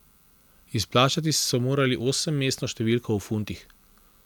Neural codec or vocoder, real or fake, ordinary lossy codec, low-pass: none; real; none; 19.8 kHz